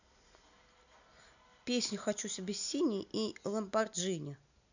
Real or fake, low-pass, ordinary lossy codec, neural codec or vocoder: real; 7.2 kHz; none; none